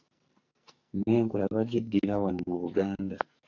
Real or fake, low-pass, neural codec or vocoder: fake; 7.2 kHz; codec, 44.1 kHz, 2.6 kbps, SNAC